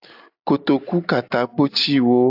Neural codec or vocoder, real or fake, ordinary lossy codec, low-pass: none; real; AAC, 48 kbps; 5.4 kHz